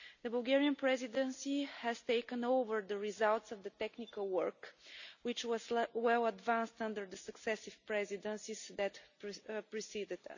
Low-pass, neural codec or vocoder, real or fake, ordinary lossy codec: 7.2 kHz; none; real; none